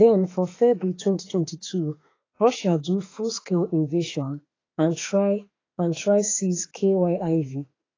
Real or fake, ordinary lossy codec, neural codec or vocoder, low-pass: fake; AAC, 32 kbps; autoencoder, 48 kHz, 32 numbers a frame, DAC-VAE, trained on Japanese speech; 7.2 kHz